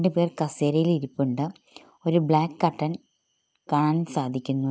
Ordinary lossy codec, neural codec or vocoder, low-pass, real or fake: none; none; none; real